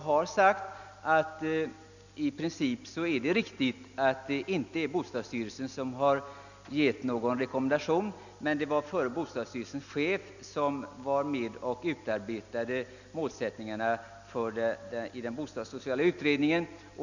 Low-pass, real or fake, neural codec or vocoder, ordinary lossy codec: 7.2 kHz; real; none; none